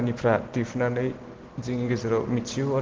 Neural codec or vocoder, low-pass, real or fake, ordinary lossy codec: none; 7.2 kHz; real; Opus, 16 kbps